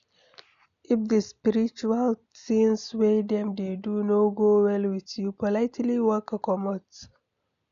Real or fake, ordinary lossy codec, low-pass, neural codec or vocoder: real; none; 7.2 kHz; none